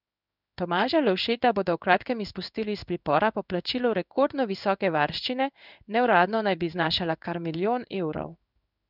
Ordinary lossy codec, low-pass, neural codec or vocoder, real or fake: none; 5.4 kHz; codec, 16 kHz in and 24 kHz out, 1 kbps, XY-Tokenizer; fake